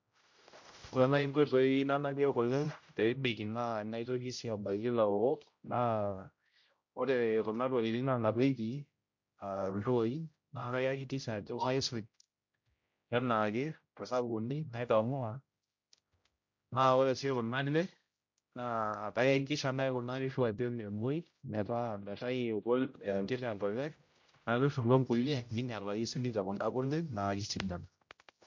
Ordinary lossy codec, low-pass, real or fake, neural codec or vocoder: MP3, 48 kbps; 7.2 kHz; fake; codec, 16 kHz, 0.5 kbps, X-Codec, HuBERT features, trained on general audio